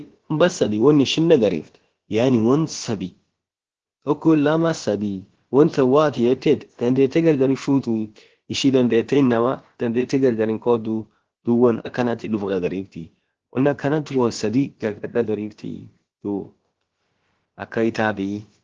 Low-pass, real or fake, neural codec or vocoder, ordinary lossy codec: 7.2 kHz; fake; codec, 16 kHz, about 1 kbps, DyCAST, with the encoder's durations; Opus, 16 kbps